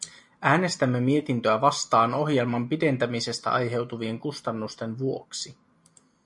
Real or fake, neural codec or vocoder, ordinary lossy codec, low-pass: real; none; MP3, 64 kbps; 10.8 kHz